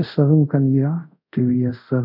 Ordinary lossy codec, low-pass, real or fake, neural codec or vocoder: none; 5.4 kHz; fake; codec, 24 kHz, 0.5 kbps, DualCodec